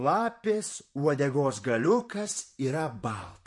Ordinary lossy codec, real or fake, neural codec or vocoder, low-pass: MP3, 48 kbps; fake; vocoder, 44.1 kHz, 128 mel bands, Pupu-Vocoder; 10.8 kHz